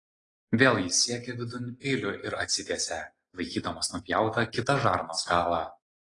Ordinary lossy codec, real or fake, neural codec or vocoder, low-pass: AAC, 32 kbps; real; none; 10.8 kHz